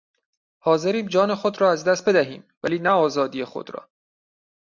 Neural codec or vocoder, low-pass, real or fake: none; 7.2 kHz; real